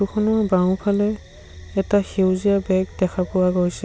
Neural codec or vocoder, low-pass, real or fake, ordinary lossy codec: none; none; real; none